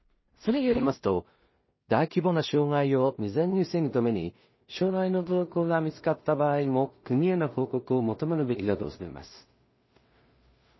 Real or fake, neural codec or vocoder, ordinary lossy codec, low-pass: fake; codec, 16 kHz in and 24 kHz out, 0.4 kbps, LongCat-Audio-Codec, two codebook decoder; MP3, 24 kbps; 7.2 kHz